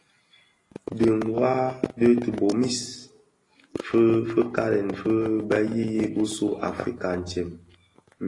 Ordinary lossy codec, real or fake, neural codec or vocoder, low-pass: AAC, 32 kbps; real; none; 10.8 kHz